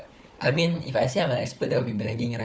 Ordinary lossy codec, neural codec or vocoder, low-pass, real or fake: none; codec, 16 kHz, 4 kbps, FunCodec, trained on Chinese and English, 50 frames a second; none; fake